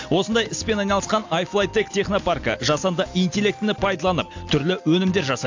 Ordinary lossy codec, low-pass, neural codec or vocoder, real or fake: AAC, 48 kbps; 7.2 kHz; none; real